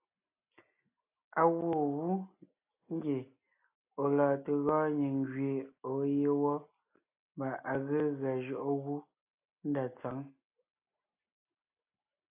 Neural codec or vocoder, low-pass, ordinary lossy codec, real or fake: none; 3.6 kHz; AAC, 32 kbps; real